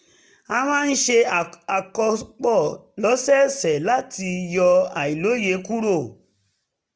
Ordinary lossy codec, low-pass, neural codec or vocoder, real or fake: none; none; none; real